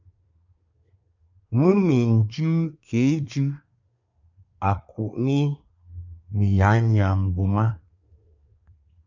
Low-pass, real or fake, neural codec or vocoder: 7.2 kHz; fake; codec, 24 kHz, 1 kbps, SNAC